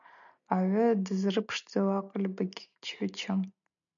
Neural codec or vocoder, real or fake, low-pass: none; real; 7.2 kHz